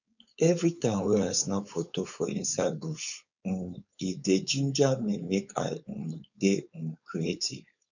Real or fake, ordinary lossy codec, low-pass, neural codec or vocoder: fake; none; 7.2 kHz; codec, 16 kHz, 4.8 kbps, FACodec